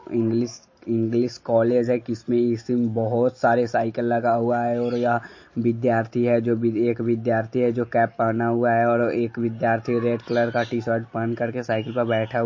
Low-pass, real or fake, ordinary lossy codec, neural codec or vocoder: 7.2 kHz; real; MP3, 32 kbps; none